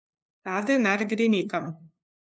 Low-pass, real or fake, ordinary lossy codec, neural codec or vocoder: none; fake; none; codec, 16 kHz, 2 kbps, FunCodec, trained on LibriTTS, 25 frames a second